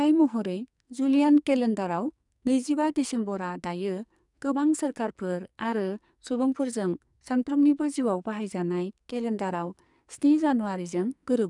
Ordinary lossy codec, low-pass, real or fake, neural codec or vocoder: none; 10.8 kHz; fake; codec, 44.1 kHz, 2.6 kbps, SNAC